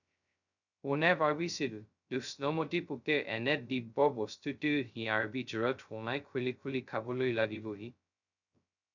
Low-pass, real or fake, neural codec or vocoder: 7.2 kHz; fake; codec, 16 kHz, 0.2 kbps, FocalCodec